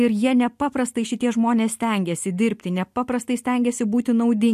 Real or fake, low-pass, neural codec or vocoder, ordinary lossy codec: real; 14.4 kHz; none; MP3, 64 kbps